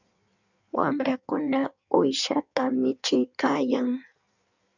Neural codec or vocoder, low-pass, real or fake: codec, 16 kHz in and 24 kHz out, 1.1 kbps, FireRedTTS-2 codec; 7.2 kHz; fake